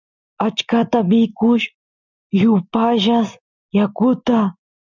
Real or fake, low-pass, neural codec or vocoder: real; 7.2 kHz; none